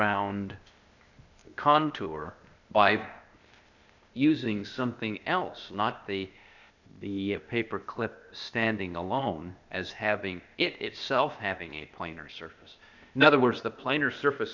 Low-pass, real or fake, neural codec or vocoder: 7.2 kHz; fake; codec, 16 kHz, 0.8 kbps, ZipCodec